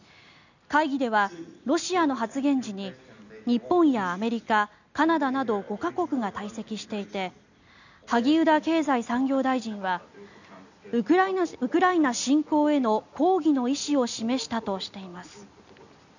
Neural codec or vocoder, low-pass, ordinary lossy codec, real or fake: none; 7.2 kHz; none; real